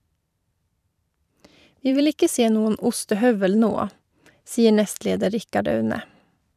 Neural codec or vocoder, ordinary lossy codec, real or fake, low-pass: vocoder, 44.1 kHz, 128 mel bands every 512 samples, BigVGAN v2; none; fake; 14.4 kHz